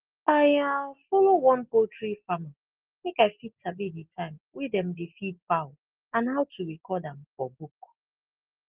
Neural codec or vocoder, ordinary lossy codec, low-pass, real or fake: none; Opus, 16 kbps; 3.6 kHz; real